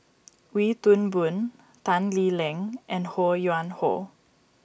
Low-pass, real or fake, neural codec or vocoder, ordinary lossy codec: none; real; none; none